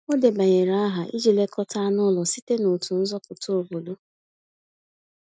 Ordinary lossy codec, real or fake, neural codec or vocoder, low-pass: none; real; none; none